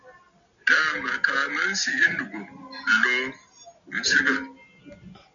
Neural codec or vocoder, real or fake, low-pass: none; real; 7.2 kHz